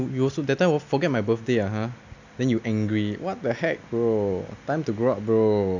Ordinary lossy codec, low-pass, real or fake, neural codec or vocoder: none; 7.2 kHz; real; none